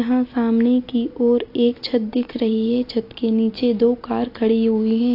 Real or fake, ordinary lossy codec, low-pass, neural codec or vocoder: real; AAC, 32 kbps; 5.4 kHz; none